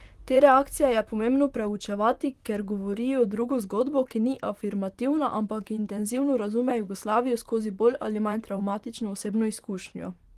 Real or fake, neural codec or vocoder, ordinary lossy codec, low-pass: fake; vocoder, 44.1 kHz, 128 mel bands, Pupu-Vocoder; Opus, 24 kbps; 14.4 kHz